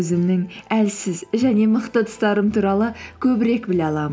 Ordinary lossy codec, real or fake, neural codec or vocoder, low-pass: none; real; none; none